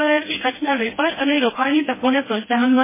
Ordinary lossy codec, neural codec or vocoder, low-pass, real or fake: MP3, 16 kbps; codec, 16 kHz, 1 kbps, FreqCodec, smaller model; 3.6 kHz; fake